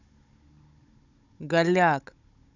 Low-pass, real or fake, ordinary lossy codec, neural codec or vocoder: 7.2 kHz; fake; none; codec, 16 kHz, 16 kbps, FunCodec, trained on Chinese and English, 50 frames a second